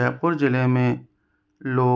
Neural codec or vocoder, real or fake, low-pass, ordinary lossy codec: none; real; none; none